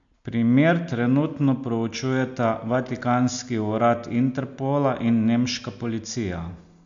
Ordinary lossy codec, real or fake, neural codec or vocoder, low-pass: MP3, 64 kbps; real; none; 7.2 kHz